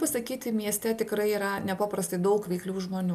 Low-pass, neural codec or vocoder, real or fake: 14.4 kHz; none; real